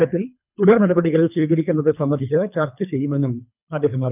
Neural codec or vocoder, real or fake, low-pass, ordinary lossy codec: codec, 24 kHz, 3 kbps, HILCodec; fake; 3.6 kHz; none